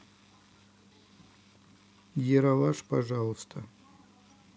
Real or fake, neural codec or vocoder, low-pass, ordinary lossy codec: real; none; none; none